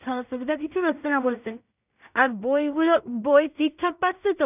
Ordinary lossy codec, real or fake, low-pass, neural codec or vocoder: none; fake; 3.6 kHz; codec, 16 kHz in and 24 kHz out, 0.4 kbps, LongCat-Audio-Codec, two codebook decoder